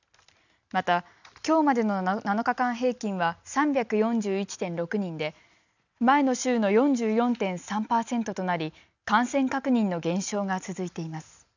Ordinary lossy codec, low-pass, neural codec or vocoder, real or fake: none; 7.2 kHz; none; real